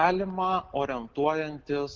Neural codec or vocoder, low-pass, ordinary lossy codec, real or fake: none; 7.2 kHz; Opus, 16 kbps; real